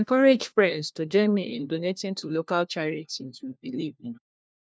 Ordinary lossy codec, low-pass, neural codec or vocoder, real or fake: none; none; codec, 16 kHz, 1 kbps, FunCodec, trained on LibriTTS, 50 frames a second; fake